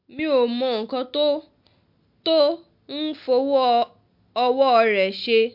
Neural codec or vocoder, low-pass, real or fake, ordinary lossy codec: none; 5.4 kHz; real; MP3, 48 kbps